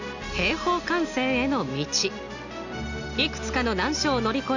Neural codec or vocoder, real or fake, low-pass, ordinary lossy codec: none; real; 7.2 kHz; none